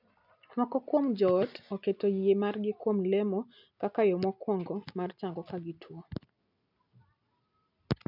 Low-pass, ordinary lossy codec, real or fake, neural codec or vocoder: 5.4 kHz; none; real; none